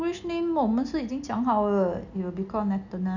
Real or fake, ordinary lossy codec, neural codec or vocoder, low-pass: real; none; none; 7.2 kHz